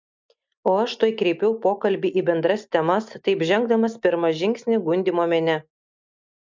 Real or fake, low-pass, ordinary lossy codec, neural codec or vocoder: real; 7.2 kHz; MP3, 64 kbps; none